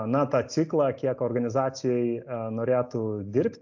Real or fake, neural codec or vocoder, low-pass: real; none; 7.2 kHz